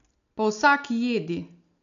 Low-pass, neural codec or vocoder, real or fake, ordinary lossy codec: 7.2 kHz; none; real; none